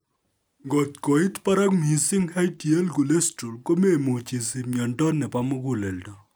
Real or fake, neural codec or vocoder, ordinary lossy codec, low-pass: real; none; none; none